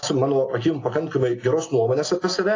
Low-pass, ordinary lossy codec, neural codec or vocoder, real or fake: 7.2 kHz; AAC, 32 kbps; none; real